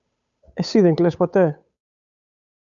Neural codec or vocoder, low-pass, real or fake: codec, 16 kHz, 8 kbps, FunCodec, trained on Chinese and English, 25 frames a second; 7.2 kHz; fake